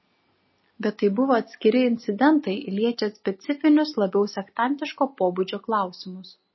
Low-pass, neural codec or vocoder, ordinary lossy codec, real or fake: 7.2 kHz; none; MP3, 24 kbps; real